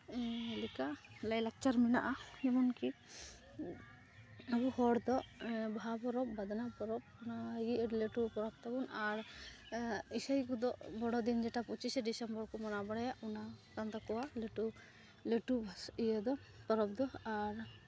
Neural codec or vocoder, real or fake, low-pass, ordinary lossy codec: none; real; none; none